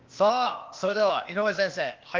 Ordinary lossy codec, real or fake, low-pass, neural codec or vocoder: Opus, 32 kbps; fake; 7.2 kHz; codec, 16 kHz, 0.8 kbps, ZipCodec